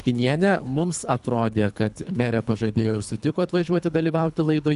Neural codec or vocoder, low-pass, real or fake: codec, 24 kHz, 3 kbps, HILCodec; 10.8 kHz; fake